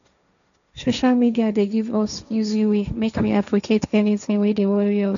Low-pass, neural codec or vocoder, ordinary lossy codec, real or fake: 7.2 kHz; codec, 16 kHz, 1.1 kbps, Voila-Tokenizer; none; fake